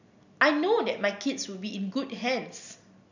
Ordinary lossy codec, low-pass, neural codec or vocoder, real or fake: none; 7.2 kHz; none; real